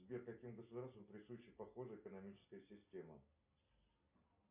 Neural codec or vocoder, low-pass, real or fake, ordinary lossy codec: none; 3.6 kHz; real; MP3, 32 kbps